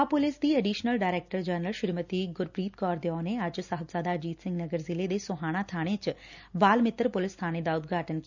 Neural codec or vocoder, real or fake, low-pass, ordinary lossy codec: none; real; 7.2 kHz; none